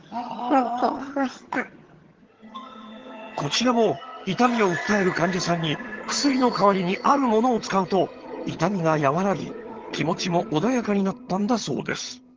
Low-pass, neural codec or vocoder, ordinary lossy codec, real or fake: 7.2 kHz; vocoder, 22.05 kHz, 80 mel bands, HiFi-GAN; Opus, 16 kbps; fake